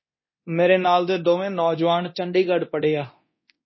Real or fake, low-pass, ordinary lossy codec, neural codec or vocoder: fake; 7.2 kHz; MP3, 24 kbps; codec, 24 kHz, 0.9 kbps, DualCodec